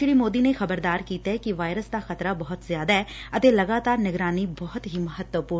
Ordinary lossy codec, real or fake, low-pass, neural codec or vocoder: none; real; none; none